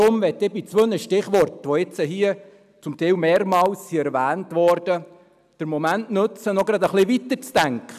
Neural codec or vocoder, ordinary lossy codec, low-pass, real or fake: none; none; 14.4 kHz; real